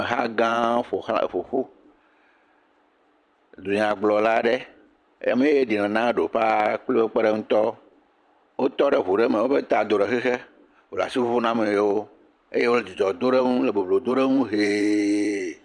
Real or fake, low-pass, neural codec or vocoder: fake; 9.9 kHz; vocoder, 44.1 kHz, 128 mel bands every 512 samples, BigVGAN v2